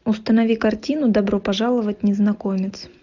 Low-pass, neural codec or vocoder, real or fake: 7.2 kHz; none; real